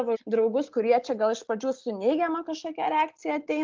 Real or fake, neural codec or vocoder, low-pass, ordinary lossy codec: real; none; 7.2 kHz; Opus, 32 kbps